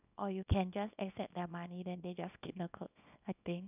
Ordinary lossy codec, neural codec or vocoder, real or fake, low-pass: none; codec, 24 kHz, 0.9 kbps, WavTokenizer, small release; fake; 3.6 kHz